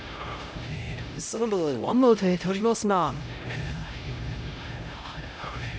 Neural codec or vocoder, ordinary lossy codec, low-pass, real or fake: codec, 16 kHz, 0.5 kbps, X-Codec, HuBERT features, trained on LibriSpeech; none; none; fake